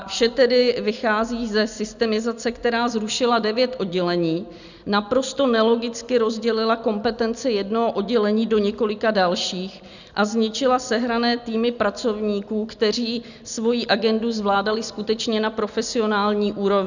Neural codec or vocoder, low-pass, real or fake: none; 7.2 kHz; real